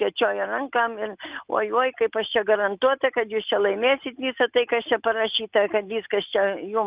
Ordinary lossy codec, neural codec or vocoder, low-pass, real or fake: Opus, 32 kbps; none; 3.6 kHz; real